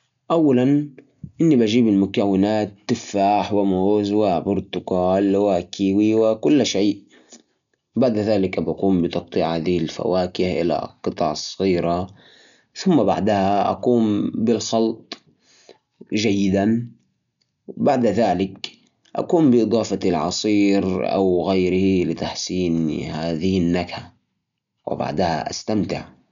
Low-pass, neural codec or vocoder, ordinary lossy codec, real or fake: 7.2 kHz; none; none; real